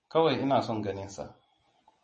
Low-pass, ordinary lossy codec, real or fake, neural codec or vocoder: 10.8 kHz; MP3, 32 kbps; real; none